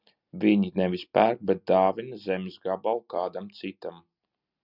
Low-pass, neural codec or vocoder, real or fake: 5.4 kHz; none; real